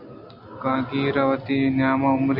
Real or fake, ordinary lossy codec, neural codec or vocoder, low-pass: real; AAC, 48 kbps; none; 5.4 kHz